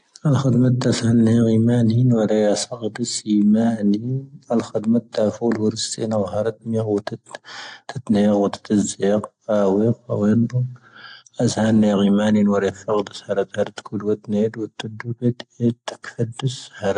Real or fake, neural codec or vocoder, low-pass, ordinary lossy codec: real; none; 9.9 kHz; AAC, 48 kbps